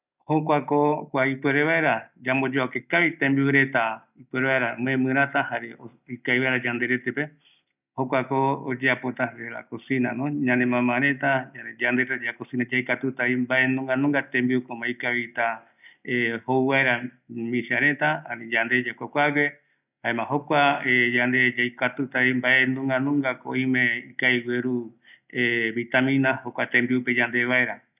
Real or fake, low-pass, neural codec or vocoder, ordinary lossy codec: real; 3.6 kHz; none; none